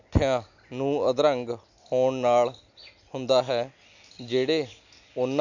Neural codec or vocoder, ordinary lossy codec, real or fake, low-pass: none; none; real; 7.2 kHz